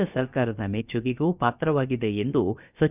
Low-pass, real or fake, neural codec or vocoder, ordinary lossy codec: 3.6 kHz; fake; codec, 16 kHz, about 1 kbps, DyCAST, with the encoder's durations; none